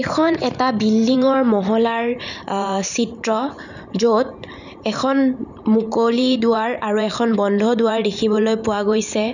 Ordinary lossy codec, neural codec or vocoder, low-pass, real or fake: none; vocoder, 44.1 kHz, 128 mel bands every 512 samples, BigVGAN v2; 7.2 kHz; fake